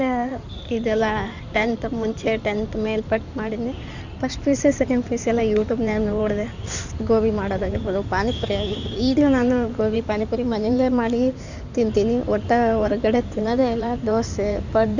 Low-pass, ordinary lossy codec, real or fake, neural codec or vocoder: 7.2 kHz; none; fake; codec, 16 kHz in and 24 kHz out, 2.2 kbps, FireRedTTS-2 codec